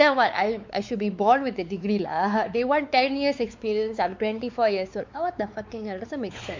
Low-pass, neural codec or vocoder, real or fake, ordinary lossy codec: 7.2 kHz; codec, 16 kHz, 4 kbps, X-Codec, WavLM features, trained on Multilingual LibriSpeech; fake; MP3, 64 kbps